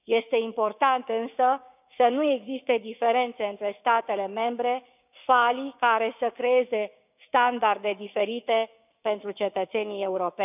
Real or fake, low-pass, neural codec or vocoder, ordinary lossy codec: fake; 3.6 kHz; codec, 16 kHz, 6 kbps, DAC; none